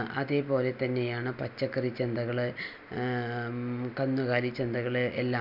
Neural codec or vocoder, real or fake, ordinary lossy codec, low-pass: none; real; none; 5.4 kHz